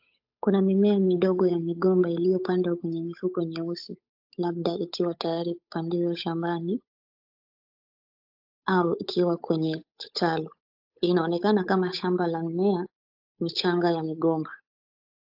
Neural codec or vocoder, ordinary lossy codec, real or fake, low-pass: codec, 16 kHz, 8 kbps, FunCodec, trained on Chinese and English, 25 frames a second; AAC, 48 kbps; fake; 5.4 kHz